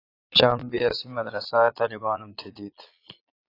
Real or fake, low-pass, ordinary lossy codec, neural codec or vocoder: fake; 5.4 kHz; AAC, 32 kbps; vocoder, 22.05 kHz, 80 mel bands, Vocos